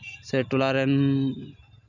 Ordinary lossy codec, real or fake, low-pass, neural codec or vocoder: none; real; 7.2 kHz; none